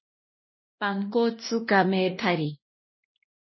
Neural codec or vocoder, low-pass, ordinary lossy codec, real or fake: codec, 16 kHz, 1 kbps, X-Codec, WavLM features, trained on Multilingual LibriSpeech; 7.2 kHz; MP3, 24 kbps; fake